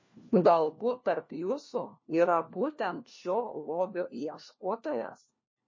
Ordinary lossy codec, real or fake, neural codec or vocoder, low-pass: MP3, 32 kbps; fake; codec, 16 kHz, 1 kbps, FunCodec, trained on LibriTTS, 50 frames a second; 7.2 kHz